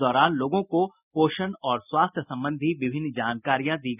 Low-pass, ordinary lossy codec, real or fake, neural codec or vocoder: 3.6 kHz; none; real; none